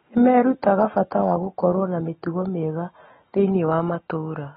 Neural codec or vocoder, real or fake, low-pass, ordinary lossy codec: none; real; 7.2 kHz; AAC, 16 kbps